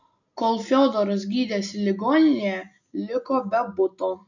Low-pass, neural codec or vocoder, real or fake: 7.2 kHz; none; real